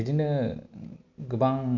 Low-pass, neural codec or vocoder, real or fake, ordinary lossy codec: 7.2 kHz; none; real; none